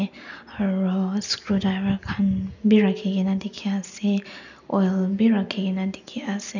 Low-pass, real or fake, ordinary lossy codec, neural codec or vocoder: 7.2 kHz; real; none; none